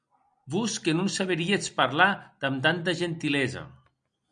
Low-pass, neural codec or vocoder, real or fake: 9.9 kHz; none; real